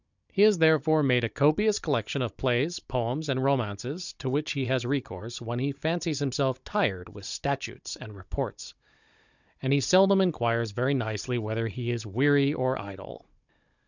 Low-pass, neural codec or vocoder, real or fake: 7.2 kHz; codec, 16 kHz, 16 kbps, FunCodec, trained on Chinese and English, 50 frames a second; fake